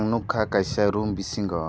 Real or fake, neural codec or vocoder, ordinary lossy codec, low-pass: real; none; none; none